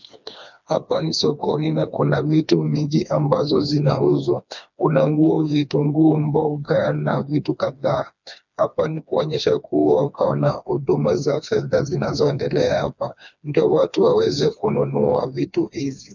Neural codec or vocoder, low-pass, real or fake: codec, 16 kHz, 2 kbps, FreqCodec, smaller model; 7.2 kHz; fake